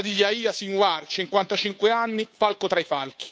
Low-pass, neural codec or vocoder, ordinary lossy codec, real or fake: none; codec, 16 kHz, 2 kbps, FunCodec, trained on Chinese and English, 25 frames a second; none; fake